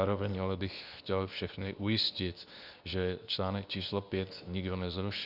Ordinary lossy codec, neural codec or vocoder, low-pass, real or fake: Opus, 64 kbps; codec, 24 kHz, 0.9 kbps, WavTokenizer, medium speech release version 2; 5.4 kHz; fake